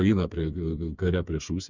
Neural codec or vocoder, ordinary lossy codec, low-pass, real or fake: codec, 16 kHz, 4 kbps, FreqCodec, smaller model; Opus, 64 kbps; 7.2 kHz; fake